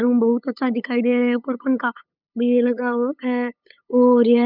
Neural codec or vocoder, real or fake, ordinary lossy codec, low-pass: codec, 16 kHz, 8 kbps, FunCodec, trained on LibriTTS, 25 frames a second; fake; none; 5.4 kHz